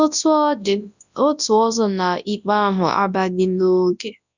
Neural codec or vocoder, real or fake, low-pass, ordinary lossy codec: codec, 24 kHz, 0.9 kbps, WavTokenizer, large speech release; fake; 7.2 kHz; none